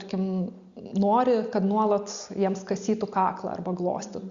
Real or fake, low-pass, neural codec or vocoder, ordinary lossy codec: real; 7.2 kHz; none; Opus, 64 kbps